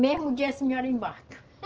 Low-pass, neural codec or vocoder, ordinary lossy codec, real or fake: 7.2 kHz; none; Opus, 16 kbps; real